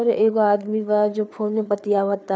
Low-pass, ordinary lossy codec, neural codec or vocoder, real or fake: none; none; codec, 16 kHz, 4 kbps, FreqCodec, larger model; fake